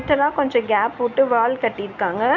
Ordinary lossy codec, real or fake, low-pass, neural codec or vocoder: none; real; 7.2 kHz; none